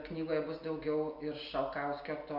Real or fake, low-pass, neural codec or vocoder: real; 5.4 kHz; none